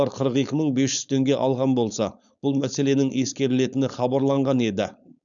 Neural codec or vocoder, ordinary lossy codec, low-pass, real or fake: codec, 16 kHz, 4.8 kbps, FACodec; none; 7.2 kHz; fake